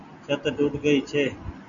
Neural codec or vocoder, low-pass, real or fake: none; 7.2 kHz; real